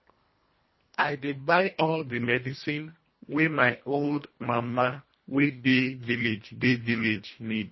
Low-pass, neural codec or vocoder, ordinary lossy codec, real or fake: 7.2 kHz; codec, 24 kHz, 1.5 kbps, HILCodec; MP3, 24 kbps; fake